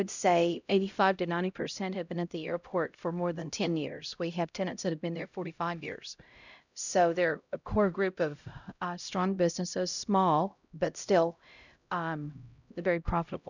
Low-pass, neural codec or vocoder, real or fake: 7.2 kHz; codec, 16 kHz, 0.5 kbps, X-Codec, HuBERT features, trained on LibriSpeech; fake